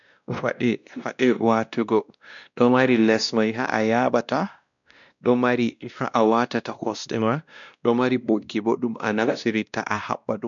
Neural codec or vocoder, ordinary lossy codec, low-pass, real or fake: codec, 16 kHz, 1 kbps, X-Codec, WavLM features, trained on Multilingual LibriSpeech; none; 7.2 kHz; fake